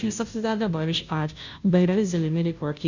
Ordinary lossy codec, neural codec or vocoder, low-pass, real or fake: none; codec, 16 kHz, 0.5 kbps, FunCodec, trained on Chinese and English, 25 frames a second; 7.2 kHz; fake